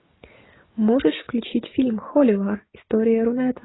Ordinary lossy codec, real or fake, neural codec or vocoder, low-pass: AAC, 16 kbps; real; none; 7.2 kHz